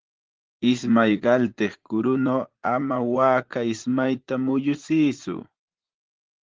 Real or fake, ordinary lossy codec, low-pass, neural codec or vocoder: fake; Opus, 16 kbps; 7.2 kHz; vocoder, 44.1 kHz, 80 mel bands, Vocos